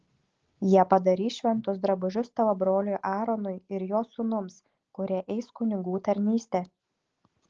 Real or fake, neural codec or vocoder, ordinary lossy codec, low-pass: real; none; Opus, 16 kbps; 7.2 kHz